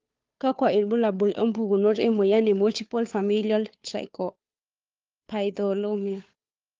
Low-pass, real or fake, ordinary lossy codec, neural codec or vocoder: 7.2 kHz; fake; Opus, 32 kbps; codec, 16 kHz, 2 kbps, FunCodec, trained on Chinese and English, 25 frames a second